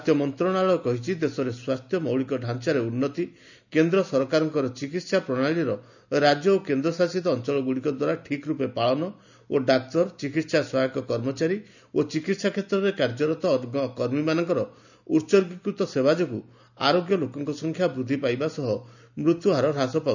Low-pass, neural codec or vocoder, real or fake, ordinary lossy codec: 7.2 kHz; none; real; none